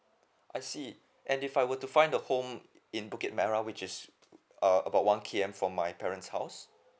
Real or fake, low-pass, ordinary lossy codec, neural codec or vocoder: real; none; none; none